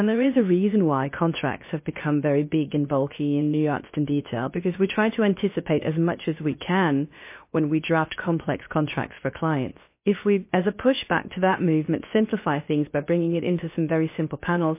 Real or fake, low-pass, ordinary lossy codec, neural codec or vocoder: fake; 3.6 kHz; MP3, 24 kbps; codec, 16 kHz, about 1 kbps, DyCAST, with the encoder's durations